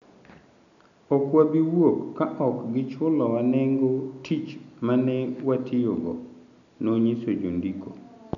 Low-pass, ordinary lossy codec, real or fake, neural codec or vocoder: 7.2 kHz; none; real; none